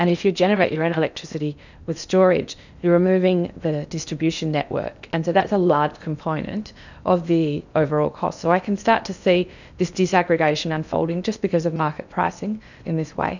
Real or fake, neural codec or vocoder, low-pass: fake; codec, 16 kHz in and 24 kHz out, 0.8 kbps, FocalCodec, streaming, 65536 codes; 7.2 kHz